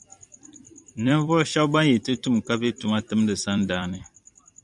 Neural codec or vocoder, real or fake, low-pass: vocoder, 44.1 kHz, 128 mel bands every 256 samples, BigVGAN v2; fake; 10.8 kHz